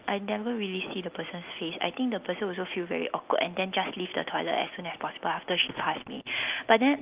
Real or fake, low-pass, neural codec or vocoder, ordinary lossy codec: real; 3.6 kHz; none; Opus, 32 kbps